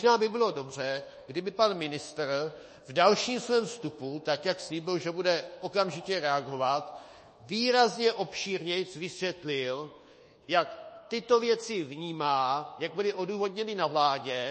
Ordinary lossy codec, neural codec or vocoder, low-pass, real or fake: MP3, 32 kbps; codec, 24 kHz, 1.2 kbps, DualCodec; 10.8 kHz; fake